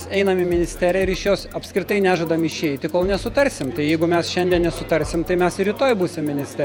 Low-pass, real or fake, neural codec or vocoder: 19.8 kHz; fake; vocoder, 48 kHz, 128 mel bands, Vocos